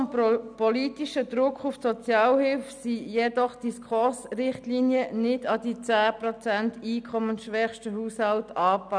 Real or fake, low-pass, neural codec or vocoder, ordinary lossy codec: real; 9.9 kHz; none; none